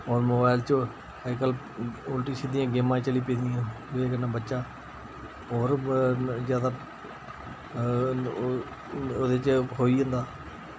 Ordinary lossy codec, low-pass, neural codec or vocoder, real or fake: none; none; none; real